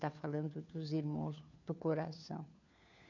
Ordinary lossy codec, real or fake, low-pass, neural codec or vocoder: none; fake; 7.2 kHz; codec, 16 kHz, 4 kbps, FunCodec, trained on LibriTTS, 50 frames a second